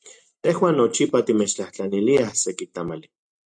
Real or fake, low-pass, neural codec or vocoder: real; 9.9 kHz; none